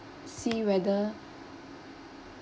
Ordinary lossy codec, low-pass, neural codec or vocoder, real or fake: none; none; none; real